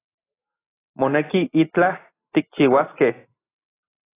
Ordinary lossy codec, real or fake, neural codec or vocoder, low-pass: AAC, 16 kbps; real; none; 3.6 kHz